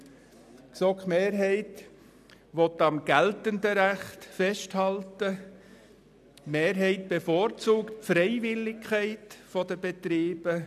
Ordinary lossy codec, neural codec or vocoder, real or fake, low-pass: none; none; real; 14.4 kHz